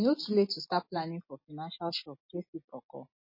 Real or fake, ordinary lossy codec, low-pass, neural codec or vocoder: real; MP3, 24 kbps; 5.4 kHz; none